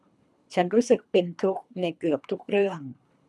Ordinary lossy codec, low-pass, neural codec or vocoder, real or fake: none; none; codec, 24 kHz, 3 kbps, HILCodec; fake